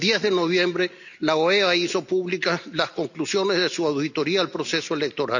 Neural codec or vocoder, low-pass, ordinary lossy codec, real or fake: none; 7.2 kHz; none; real